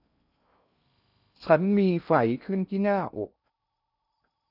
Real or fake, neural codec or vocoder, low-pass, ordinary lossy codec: fake; codec, 16 kHz in and 24 kHz out, 0.6 kbps, FocalCodec, streaming, 2048 codes; 5.4 kHz; none